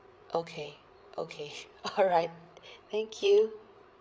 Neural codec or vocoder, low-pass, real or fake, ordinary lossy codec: codec, 16 kHz, 8 kbps, FreqCodec, larger model; none; fake; none